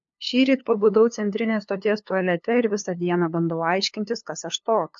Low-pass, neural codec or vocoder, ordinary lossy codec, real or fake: 7.2 kHz; codec, 16 kHz, 2 kbps, FunCodec, trained on LibriTTS, 25 frames a second; MP3, 48 kbps; fake